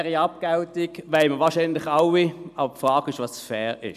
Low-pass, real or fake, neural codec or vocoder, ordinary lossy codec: 14.4 kHz; real; none; none